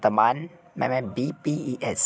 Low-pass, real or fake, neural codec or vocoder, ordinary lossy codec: none; real; none; none